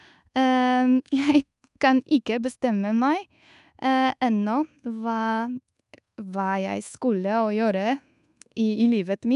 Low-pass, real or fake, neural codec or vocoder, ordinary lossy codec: 10.8 kHz; fake; codec, 24 kHz, 1.2 kbps, DualCodec; none